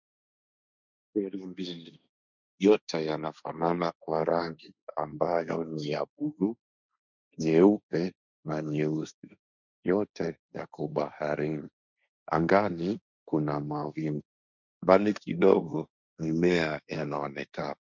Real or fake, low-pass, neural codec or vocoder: fake; 7.2 kHz; codec, 16 kHz, 1.1 kbps, Voila-Tokenizer